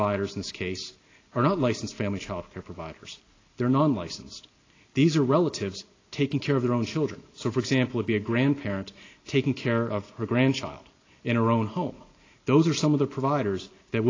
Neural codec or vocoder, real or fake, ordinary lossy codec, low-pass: none; real; AAC, 32 kbps; 7.2 kHz